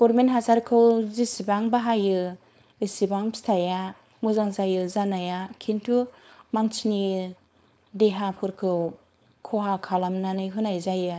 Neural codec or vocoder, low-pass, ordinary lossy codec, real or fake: codec, 16 kHz, 4.8 kbps, FACodec; none; none; fake